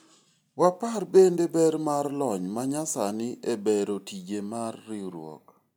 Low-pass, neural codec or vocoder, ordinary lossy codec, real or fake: none; none; none; real